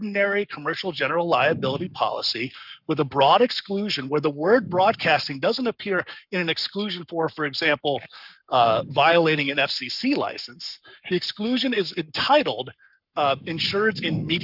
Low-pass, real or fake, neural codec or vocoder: 5.4 kHz; fake; codec, 44.1 kHz, 7.8 kbps, Pupu-Codec